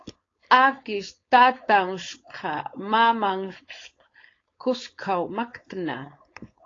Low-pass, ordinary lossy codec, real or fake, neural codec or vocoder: 7.2 kHz; AAC, 32 kbps; fake; codec, 16 kHz, 4.8 kbps, FACodec